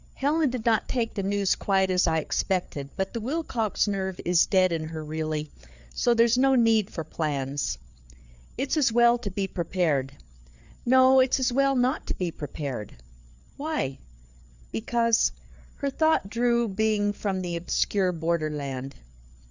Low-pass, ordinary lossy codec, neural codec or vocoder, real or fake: 7.2 kHz; Opus, 64 kbps; codec, 16 kHz, 4 kbps, FreqCodec, larger model; fake